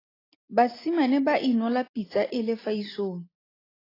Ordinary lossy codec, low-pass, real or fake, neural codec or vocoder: AAC, 24 kbps; 5.4 kHz; real; none